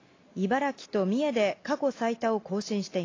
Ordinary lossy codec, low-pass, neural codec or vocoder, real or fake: AAC, 32 kbps; 7.2 kHz; none; real